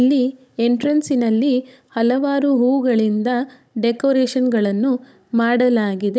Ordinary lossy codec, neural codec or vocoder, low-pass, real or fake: none; codec, 16 kHz, 16 kbps, FunCodec, trained on Chinese and English, 50 frames a second; none; fake